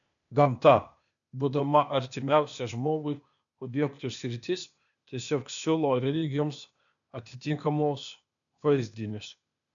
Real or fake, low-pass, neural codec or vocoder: fake; 7.2 kHz; codec, 16 kHz, 0.8 kbps, ZipCodec